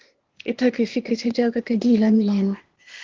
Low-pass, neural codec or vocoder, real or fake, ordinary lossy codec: 7.2 kHz; codec, 16 kHz, 0.8 kbps, ZipCodec; fake; Opus, 16 kbps